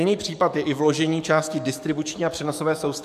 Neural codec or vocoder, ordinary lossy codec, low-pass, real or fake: codec, 44.1 kHz, 7.8 kbps, DAC; MP3, 96 kbps; 14.4 kHz; fake